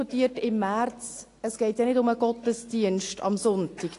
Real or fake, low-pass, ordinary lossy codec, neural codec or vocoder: real; 10.8 kHz; AAC, 48 kbps; none